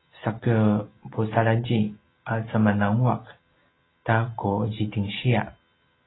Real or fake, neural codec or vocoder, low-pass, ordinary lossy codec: real; none; 7.2 kHz; AAC, 16 kbps